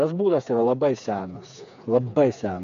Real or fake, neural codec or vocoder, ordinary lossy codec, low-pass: fake; codec, 16 kHz, 4 kbps, FreqCodec, smaller model; AAC, 64 kbps; 7.2 kHz